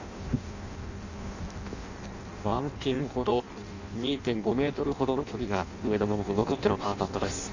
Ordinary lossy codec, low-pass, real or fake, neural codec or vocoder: none; 7.2 kHz; fake; codec, 16 kHz in and 24 kHz out, 0.6 kbps, FireRedTTS-2 codec